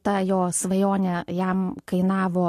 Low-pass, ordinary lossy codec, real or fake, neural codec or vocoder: 14.4 kHz; AAC, 48 kbps; real; none